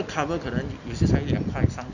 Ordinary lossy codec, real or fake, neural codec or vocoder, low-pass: none; real; none; 7.2 kHz